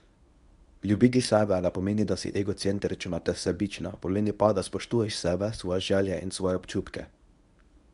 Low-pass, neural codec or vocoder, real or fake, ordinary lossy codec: 10.8 kHz; codec, 24 kHz, 0.9 kbps, WavTokenizer, medium speech release version 1; fake; none